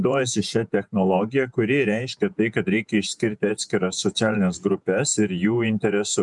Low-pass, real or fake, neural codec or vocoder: 10.8 kHz; fake; vocoder, 48 kHz, 128 mel bands, Vocos